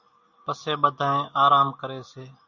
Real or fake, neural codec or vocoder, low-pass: real; none; 7.2 kHz